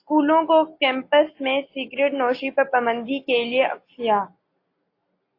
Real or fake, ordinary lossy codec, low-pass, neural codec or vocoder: real; AAC, 32 kbps; 5.4 kHz; none